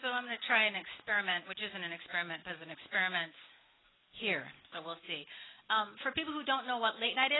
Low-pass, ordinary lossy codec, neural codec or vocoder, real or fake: 7.2 kHz; AAC, 16 kbps; none; real